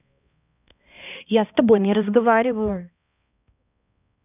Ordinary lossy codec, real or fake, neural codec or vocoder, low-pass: none; fake; codec, 16 kHz, 1 kbps, X-Codec, HuBERT features, trained on balanced general audio; 3.6 kHz